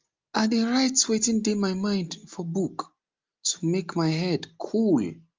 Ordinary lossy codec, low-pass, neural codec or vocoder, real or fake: Opus, 32 kbps; 7.2 kHz; none; real